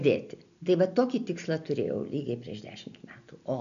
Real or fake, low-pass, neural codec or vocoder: real; 7.2 kHz; none